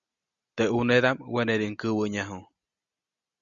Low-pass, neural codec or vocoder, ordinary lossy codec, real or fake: 7.2 kHz; none; Opus, 64 kbps; real